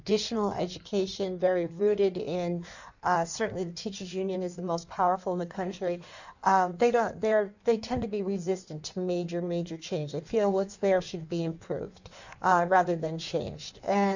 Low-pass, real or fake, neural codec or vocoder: 7.2 kHz; fake; codec, 16 kHz in and 24 kHz out, 1.1 kbps, FireRedTTS-2 codec